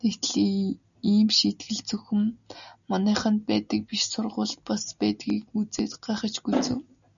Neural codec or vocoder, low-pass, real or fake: none; 7.2 kHz; real